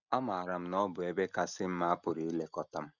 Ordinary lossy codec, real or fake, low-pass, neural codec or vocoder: none; real; 7.2 kHz; none